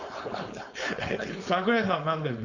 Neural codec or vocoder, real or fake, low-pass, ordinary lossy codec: codec, 16 kHz, 4.8 kbps, FACodec; fake; 7.2 kHz; AAC, 48 kbps